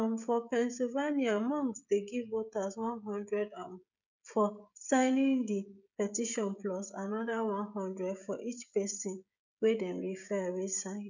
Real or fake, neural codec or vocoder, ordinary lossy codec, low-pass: fake; codec, 16 kHz, 16 kbps, FreqCodec, smaller model; none; 7.2 kHz